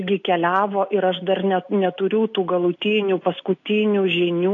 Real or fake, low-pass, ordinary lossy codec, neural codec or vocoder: real; 7.2 kHz; AAC, 48 kbps; none